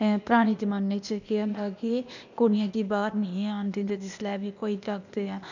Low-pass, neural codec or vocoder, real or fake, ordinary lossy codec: 7.2 kHz; codec, 16 kHz, 0.8 kbps, ZipCodec; fake; none